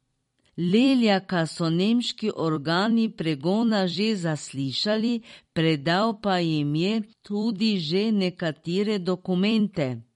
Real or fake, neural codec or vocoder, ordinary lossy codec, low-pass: fake; vocoder, 44.1 kHz, 128 mel bands every 256 samples, BigVGAN v2; MP3, 48 kbps; 19.8 kHz